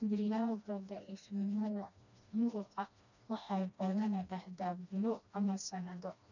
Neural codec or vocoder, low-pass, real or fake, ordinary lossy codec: codec, 16 kHz, 1 kbps, FreqCodec, smaller model; 7.2 kHz; fake; none